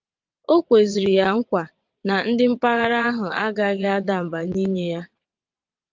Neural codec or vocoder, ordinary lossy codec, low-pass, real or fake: vocoder, 22.05 kHz, 80 mel bands, WaveNeXt; Opus, 24 kbps; 7.2 kHz; fake